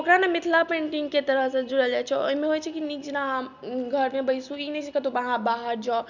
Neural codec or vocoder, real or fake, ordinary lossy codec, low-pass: none; real; none; 7.2 kHz